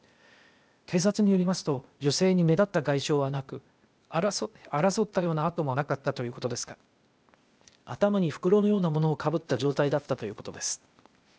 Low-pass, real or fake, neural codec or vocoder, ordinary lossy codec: none; fake; codec, 16 kHz, 0.8 kbps, ZipCodec; none